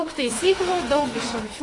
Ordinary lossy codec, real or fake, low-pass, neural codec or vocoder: AAC, 32 kbps; fake; 10.8 kHz; autoencoder, 48 kHz, 32 numbers a frame, DAC-VAE, trained on Japanese speech